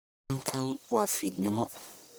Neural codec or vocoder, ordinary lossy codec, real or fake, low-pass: codec, 44.1 kHz, 1.7 kbps, Pupu-Codec; none; fake; none